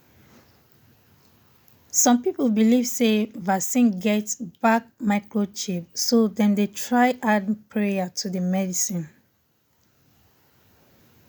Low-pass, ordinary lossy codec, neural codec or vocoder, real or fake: none; none; none; real